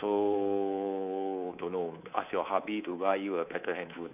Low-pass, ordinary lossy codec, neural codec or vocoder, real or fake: 3.6 kHz; none; codec, 24 kHz, 3.1 kbps, DualCodec; fake